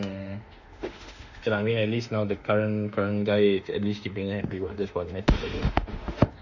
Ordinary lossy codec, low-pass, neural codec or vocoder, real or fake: none; 7.2 kHz; autoencoder, 48 kHz, 32 numbers a frame, DAC-VAE, trained on Japanese speech; fake